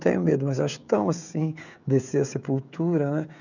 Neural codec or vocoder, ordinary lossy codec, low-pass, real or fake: codec, 16 kHz, 16 kbps, FreqCodec, smaller model; none; 7.2 kHz; fake